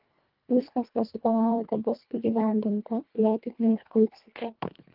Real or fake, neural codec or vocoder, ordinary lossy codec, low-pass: fake; codec, 24 kHz, 1.5 kbps, HILCodec; Opus, 24 kbps; 5.4 kHz